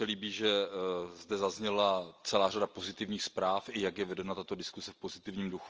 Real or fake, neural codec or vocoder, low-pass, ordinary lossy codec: real; none; 7.2 kHz; Opus, 24 kbps